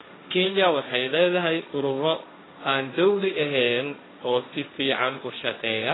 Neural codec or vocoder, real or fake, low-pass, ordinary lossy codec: codec, 16 kHz, 1.1 kbps, Voila-Tokenizer; fake; 7.2 kHz; AAC, 16 kbps